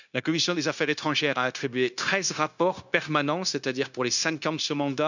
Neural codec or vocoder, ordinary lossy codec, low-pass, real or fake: codec, 16 kHz, 0.9 kbps, LongCat-Audio-Codec; none; 7.2 kHz; fake